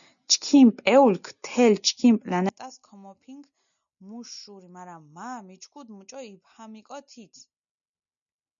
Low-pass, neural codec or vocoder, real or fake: 7.2 kHz; none; real